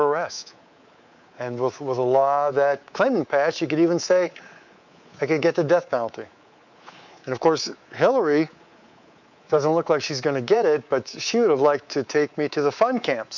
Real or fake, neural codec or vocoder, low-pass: fake; codec, 24 kHz, 3.1 kbps, DualCodec; 7.2 kHz